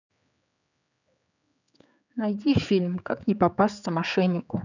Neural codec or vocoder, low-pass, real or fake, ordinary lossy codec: codec, 16 kHz, 4 kbps, X-Codec, HuBERT features, trained on general audio; 7.2 kHz; fake; none